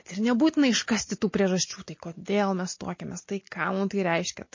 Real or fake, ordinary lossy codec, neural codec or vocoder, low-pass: real; MP3, 32 kbps; none; 7.2 kHz